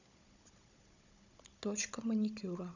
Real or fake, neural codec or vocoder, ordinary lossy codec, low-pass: fake; codec, 16 kHz, 16 kbps, FunCodec, trained on Chinese and English, 50 frames a second; none; 7.2 kHz